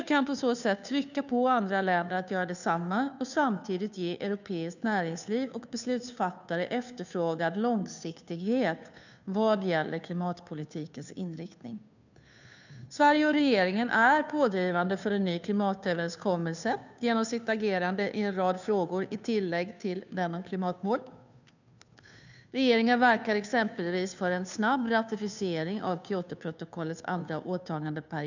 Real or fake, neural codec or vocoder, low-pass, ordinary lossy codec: fake; codec, 16 kHz, 2 kbps, FunCodec, trained on Chinese and English, 25 frames a second; 7.2 kHz; none